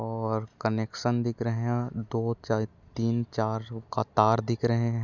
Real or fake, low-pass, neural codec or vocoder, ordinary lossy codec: real; 7.2 kHz; none; none